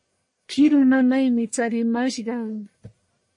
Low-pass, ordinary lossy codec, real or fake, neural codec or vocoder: 10.8 kHz; MP3, 48 kbps; fake; codec, 44.1 kHz, 1.7 kbps, Pupu-Codec